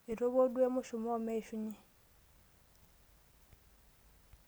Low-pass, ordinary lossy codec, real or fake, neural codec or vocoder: none; none; real; none